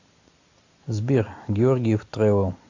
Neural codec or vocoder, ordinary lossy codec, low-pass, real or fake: none; AAC, 48 kbps; 7.2 kHz; real